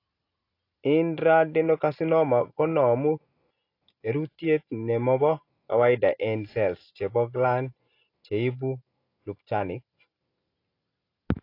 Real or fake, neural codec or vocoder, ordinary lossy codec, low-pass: real; none; AAC, 32 kbps; 5.4 kHz